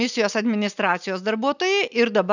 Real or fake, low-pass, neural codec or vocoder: real; 7.2 kHz; none